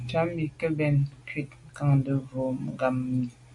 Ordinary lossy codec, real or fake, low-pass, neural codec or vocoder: MP3, 48 kbps; real; 10.8 kHz; none